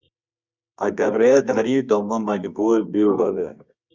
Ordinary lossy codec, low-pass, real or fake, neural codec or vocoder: Opus, 64 kbps; 7.2 kHz; fake; codec, 24 kHz, 0.9 kbps, WavTokenizer, medium music audio release